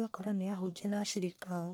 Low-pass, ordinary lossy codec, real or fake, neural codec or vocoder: none; none; fake; codec, 44.1 kHz, 1.7 kbps, Pupu-Codec